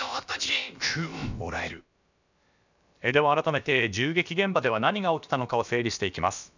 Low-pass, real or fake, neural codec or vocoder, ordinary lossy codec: 7.2 kHz; fake; codec, 16 kHz, about 1 kbps, DyCAST, with the encoder's durations; none